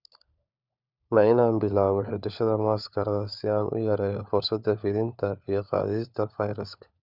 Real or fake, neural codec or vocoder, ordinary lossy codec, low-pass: fake; codec, 16 kHz, 4 kbps, FunCodec, trained on LibriTTS, 50 frames a second; none; 5.4 kHz